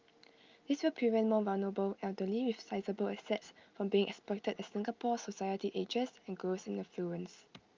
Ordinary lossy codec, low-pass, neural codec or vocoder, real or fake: Opus, 24 kbps; 7.2 kHz; none; real